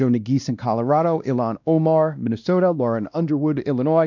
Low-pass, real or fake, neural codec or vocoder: 7.2 kHz; fake; codec, 16 kHz, 1 kbps, X-Codec, WavLM features, trained on Multilingual LibriSpeech